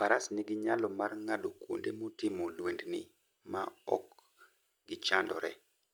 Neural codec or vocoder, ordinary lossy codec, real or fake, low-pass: none; none; real; none